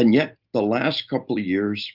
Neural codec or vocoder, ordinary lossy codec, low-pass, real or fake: none; Opus, 24 kbps; 5.4 kHz; real